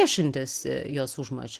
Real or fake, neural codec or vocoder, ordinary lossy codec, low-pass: real; none; Opus, 16 kbps; 14.4 kHz